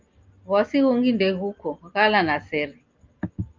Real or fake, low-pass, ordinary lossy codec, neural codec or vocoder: real; 7.2 kHz; Opus, 32 kbps; none